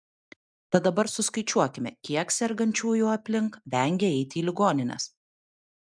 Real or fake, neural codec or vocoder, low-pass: real; none; 9.9 kHz